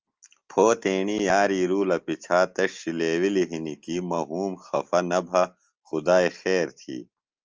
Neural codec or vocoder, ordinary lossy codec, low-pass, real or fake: none; Opus, 24 kbps; 7.2 kHz; real